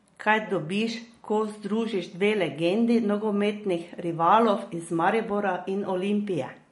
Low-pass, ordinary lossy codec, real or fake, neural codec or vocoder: 19.8 kHz; MP3, 48 kbps; real; none